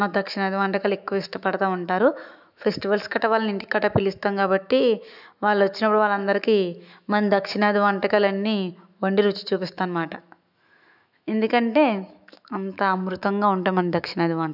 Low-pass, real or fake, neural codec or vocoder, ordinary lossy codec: 5.4 kHz; fake; autoencoder, 48 kHz, 128 numbers a frame, DAC-VAE, trained on Japanese speech; none